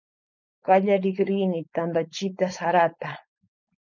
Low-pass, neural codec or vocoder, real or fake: 7.2 kHz; codec, 16 kHz, 4.8 kbps, FACodec; fake